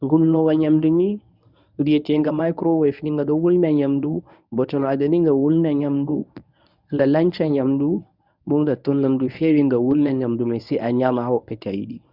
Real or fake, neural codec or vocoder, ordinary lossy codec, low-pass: fake; codec, 24 kHz, 0.9 kbps, WavTokenizer, medium speech release version 1; none; 5.4 kHz